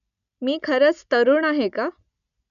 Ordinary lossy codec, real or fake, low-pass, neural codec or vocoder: none; real; 7.2 kHz; none